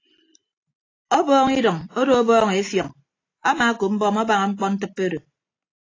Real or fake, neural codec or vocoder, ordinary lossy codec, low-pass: real; none; AAC, 32 kbps; 7.2 kHz